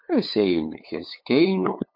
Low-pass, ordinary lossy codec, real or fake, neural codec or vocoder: 5.4 kHz; MP3, 32 kbps; fake; codec, 16 kHz, 8 kbps, FunCodec, trained on LibriTTS, 25 frames a second